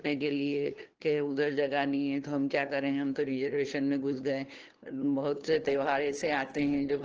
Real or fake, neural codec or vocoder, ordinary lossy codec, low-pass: fake; codec, 16 kHz, 2 kbps, FunCodec, trained on LibriTTS, 25 frames a second; Opus, 16 kbps; 7.2 kHz